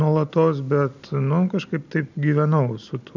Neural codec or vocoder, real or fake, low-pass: none; real; 7.2 kHz